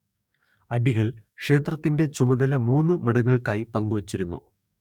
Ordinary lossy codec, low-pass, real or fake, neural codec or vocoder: none; 19.8 kHz; fake; codec, 44.1 kHz, 2.6 kbps, DAC